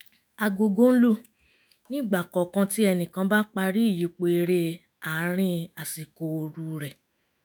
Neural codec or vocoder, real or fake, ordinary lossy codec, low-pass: autoencoder, 48 kHz, 128 numbers a frame, DAC-VAE, trained on Japanese speech; fake; none; none